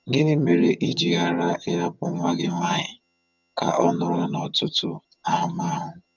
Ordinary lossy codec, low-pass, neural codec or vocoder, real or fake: none; 7.2 kHz; vocoder, 22.05 kHz, 80 mel bands, HiFi-GAN; fake